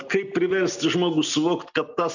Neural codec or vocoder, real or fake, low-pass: none; real; 7.2 kHz